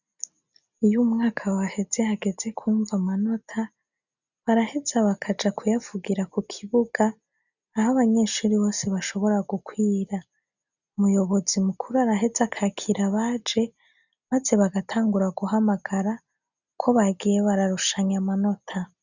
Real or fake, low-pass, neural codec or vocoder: real; 7.2 kHz; none